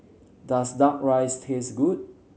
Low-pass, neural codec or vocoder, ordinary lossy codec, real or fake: none; none; none; real